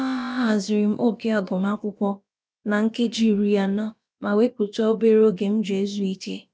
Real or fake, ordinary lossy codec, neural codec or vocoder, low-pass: fake; none; codec, 16 kHz, about 1 kbps, DyCAST, with the encoder's durations; none